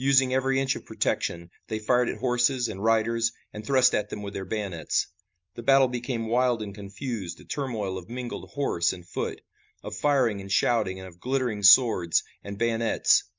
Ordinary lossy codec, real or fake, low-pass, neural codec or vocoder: MP3, 64 kbps; real; 7.2 kHz; none